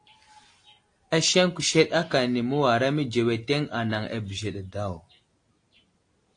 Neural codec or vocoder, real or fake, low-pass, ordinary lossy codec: none; real; 9.9 kHz; AAC, 48 kbps